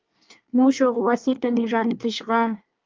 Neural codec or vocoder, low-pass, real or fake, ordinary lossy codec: codec, 24 kHz, 1 kbps, SNAC; 7.2 kHz; fake; Opus, 24 kbps